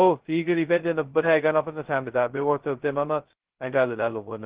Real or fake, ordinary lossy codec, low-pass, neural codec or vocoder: fake; Opus, 16 kbps; 3.6 kHz; codec, 16 kHz, 0.2 kbps, FocalCodec